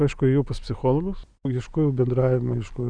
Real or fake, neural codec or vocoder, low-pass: real; none; 9.9 kHz